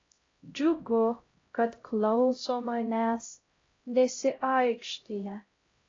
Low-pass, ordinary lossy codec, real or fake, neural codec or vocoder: 7.2 kHz; AAC, 32 kbps; fake; codec, 16 kHz, 0.5 kbps, X-Codec, HuBERT features, trained on LibriSpeech